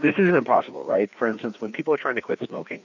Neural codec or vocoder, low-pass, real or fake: codec, 16 kHz, 2 kbps, FreqCodec, larger model; 7.2 kHz; fake